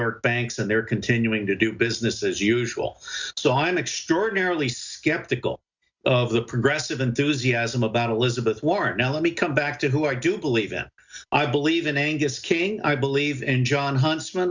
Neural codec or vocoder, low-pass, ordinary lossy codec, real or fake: none; 7.2 kHz; Opus, 64 kbps; real